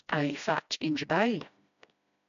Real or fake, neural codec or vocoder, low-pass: fake; codec, 16 kHz, 1 kbps, FreqCodec, smaller model; 7.2 kHz